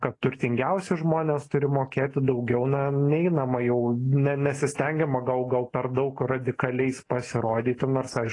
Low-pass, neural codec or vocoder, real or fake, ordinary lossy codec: 10.8 kHz; none; real; AAC, 32 kbps